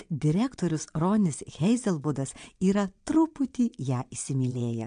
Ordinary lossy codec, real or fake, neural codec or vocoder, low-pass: MP3, 64 kbps; fake; vocoder, 22.05 kHz, 80 mel bands, Vocos; 9.9 kHz